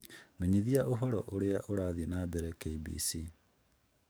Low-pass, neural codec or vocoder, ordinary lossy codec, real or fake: none; codec, 44.1 kHz, 7.8 kbps, DAC; none; fake